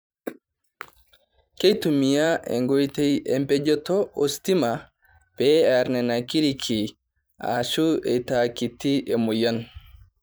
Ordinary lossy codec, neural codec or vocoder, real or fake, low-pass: none; vocoder, 44.1 kHz, 128 mel bands every 512 samples, BigVGAN v2; fake; none